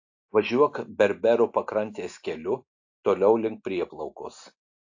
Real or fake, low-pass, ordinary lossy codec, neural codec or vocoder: real; 7.2 kHz; AAC, 48 kbps; none